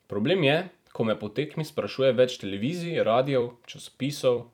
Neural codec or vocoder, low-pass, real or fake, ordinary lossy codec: vocoder, 44.1 kHz, 128 mel bands every 256 samples, BigVGAN v2; 19.8 kHz; fake; none